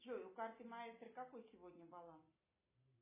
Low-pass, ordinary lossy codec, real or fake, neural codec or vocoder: 3.6 kHz; MP3, 16 kbps; real; none